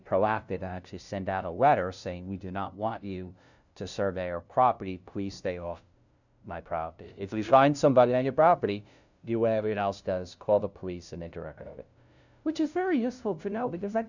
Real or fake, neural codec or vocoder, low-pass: fake; codec, 16 kHz, 0.5 kbps, FunCodec, trained on LibriTTS, 25 frames a second; 7.2 kHz